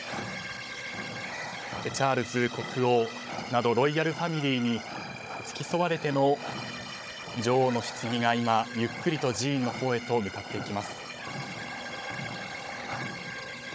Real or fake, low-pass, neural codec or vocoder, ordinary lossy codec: fake; none; codec, 16 kHz, 16 kbps, FunCodec, trained on Chinese and English, 50 frames a second; none